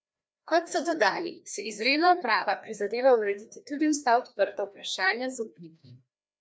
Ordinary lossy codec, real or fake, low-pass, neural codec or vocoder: none; fake; none; codec, 16 kHz, 1 kbps, FreqCodec, larger model